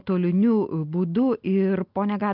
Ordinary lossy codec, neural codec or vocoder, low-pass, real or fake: Opus, 24 kbps; none; 5.4 kHz; real